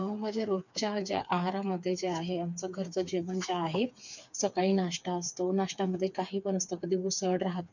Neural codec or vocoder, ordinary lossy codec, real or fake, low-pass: codec, 16 kHz, 4 kbps, FreqCodec, smaller model; none; fake; 7.2 kHz